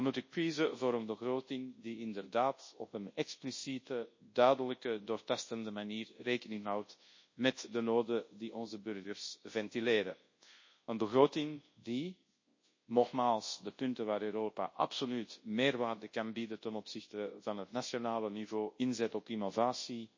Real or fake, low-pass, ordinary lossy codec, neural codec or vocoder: fake; 7.2 kHz; MP3, 32 kbps; codec, 24 kHz, 0.9 kbps, WavTokenizer, large speech release